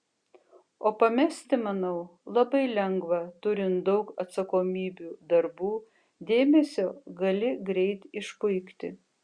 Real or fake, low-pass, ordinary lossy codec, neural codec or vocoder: real; 9.9 kHz; Opus, 64 kbps; none